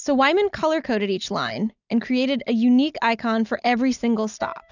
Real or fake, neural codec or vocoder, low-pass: real; none; 7.2 kHz